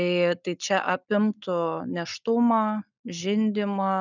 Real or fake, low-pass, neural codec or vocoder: real; 7.2 kHz; none